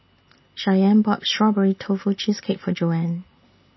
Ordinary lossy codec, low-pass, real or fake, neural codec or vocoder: MP3, 24 kbps; 7.2 kHz; real; none